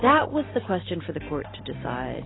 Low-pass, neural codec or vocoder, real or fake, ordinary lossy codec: 7.2 kHz; vocoder, 44.1 kHz, 128 mel bands every 256 samples, BigVGAN v2; fake; AAC, 16 kbps